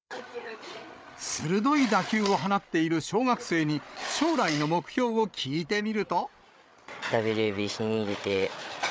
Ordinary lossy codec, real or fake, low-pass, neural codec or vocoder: none; fake; none; codec, 16 kHz, 8 kbps, FreqCodec, larger model